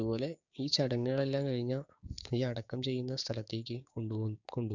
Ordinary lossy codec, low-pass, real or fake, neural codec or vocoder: none; 7.2 kHz; fake; codec, 16 kHz, 6 kbps, DAC